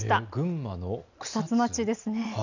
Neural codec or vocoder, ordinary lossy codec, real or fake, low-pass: none; none; real; 7.2 kHz